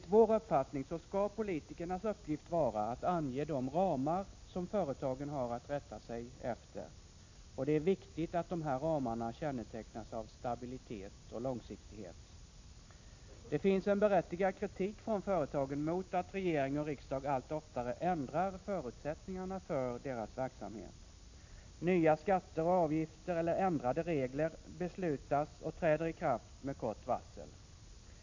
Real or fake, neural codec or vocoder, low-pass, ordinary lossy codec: real; none; 7.2 kHz; AAC, 48 kbps